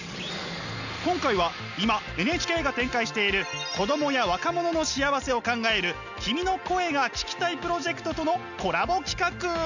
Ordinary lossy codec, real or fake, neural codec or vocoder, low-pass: none; real; none; 7.2 kHz